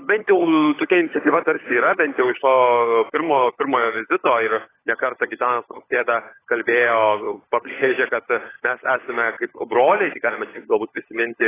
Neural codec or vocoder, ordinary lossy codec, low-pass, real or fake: codec, 16 kHz, 16 kbps, FunCodec, trained on LibriTTS, 50 frames a second; AAC, 16 kbps; 3.6 kHz; fake